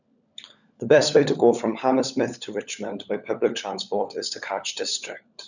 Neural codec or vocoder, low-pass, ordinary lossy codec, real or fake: codec, 16 kHz, 16 kbps, FunCodec, trained on LibriTTS, 50 frames a second; 7.2 kHz; none; fake